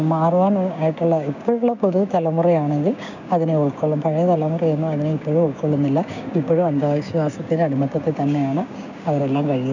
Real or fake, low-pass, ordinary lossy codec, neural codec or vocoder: fake; 7.2 kHz; none; codec, 16 kHz, 6 kbps, DAC